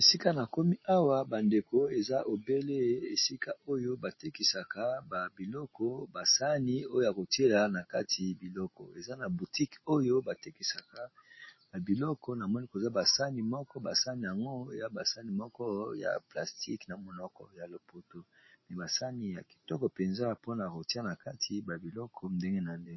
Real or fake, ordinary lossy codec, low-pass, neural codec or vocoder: real; MP3, 24 kbps; 7.2 kHz; none